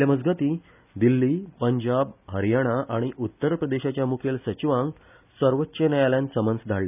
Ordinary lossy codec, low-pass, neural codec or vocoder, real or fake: none; 3.6 kHz; none; real